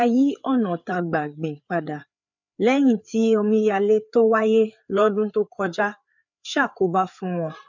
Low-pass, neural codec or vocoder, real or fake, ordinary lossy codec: 7.2 kHz; codec, 16 kHz, 4 kbps, FreqCodec, larger model; fake; none